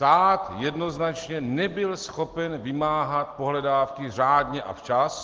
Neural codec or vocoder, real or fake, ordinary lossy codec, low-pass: none; real; Opus, 16 kbps; 7.2 kHz